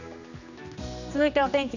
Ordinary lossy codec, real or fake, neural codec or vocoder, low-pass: none; fake; codec, 16 kHz, 2 kbps, X-Codec, HuBERT features, trained on general audio; 7.2 kHz